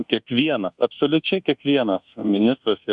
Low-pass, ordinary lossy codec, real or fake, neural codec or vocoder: 10.8 kHz; Opus, 64 kbps; fake; codec, 24 kHz, 1.2 kbps, DualCodec